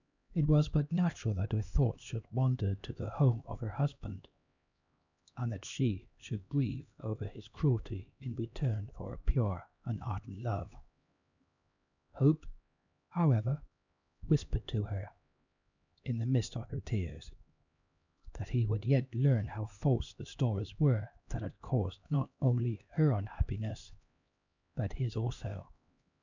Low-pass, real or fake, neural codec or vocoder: 7.2 kHz; fake; codec, 16 kHz, 2 kbps, X-Codec, HuBERT features, trained on LibriSpeech